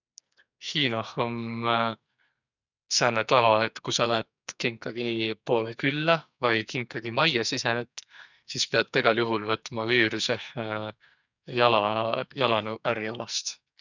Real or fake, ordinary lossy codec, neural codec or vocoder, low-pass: fake; none; codec, 44.1 kHz, 2.6 kbps, SNAC; 7.2 kHz